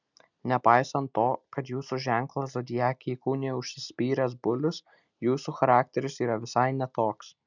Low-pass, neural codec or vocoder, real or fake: 7.2 kHz; none; real